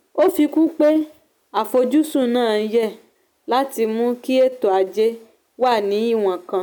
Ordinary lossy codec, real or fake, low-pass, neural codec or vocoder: none; real; none; none